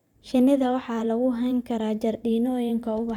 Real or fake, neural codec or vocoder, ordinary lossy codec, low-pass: fake; vocoder, 44.1 kHz, 128 mel bands every 512 samples, BigVGAN v2; none; 19.8 kHz